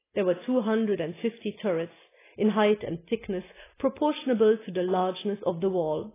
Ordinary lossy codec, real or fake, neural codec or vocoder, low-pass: AAC, 16 kbps; real; none; 3.6 kHz